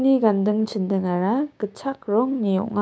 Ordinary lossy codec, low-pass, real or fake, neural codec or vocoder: none; none; fake; codec, 16 kHz, 6 kbps, DAC